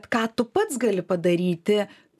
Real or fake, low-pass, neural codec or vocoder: real; 14.4 kHz; none